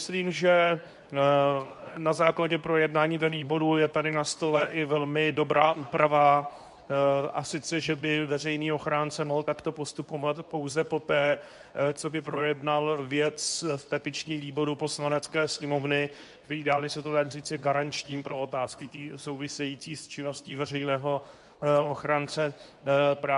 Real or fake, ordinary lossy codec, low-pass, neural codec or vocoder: fake; AAC, 96 kbps; 10.8 kHz; codec, 24 kHz, 0.9 kbps, WavTokenizer, medium speech release version 2